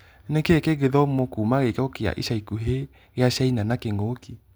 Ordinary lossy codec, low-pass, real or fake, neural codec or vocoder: none; none; real; none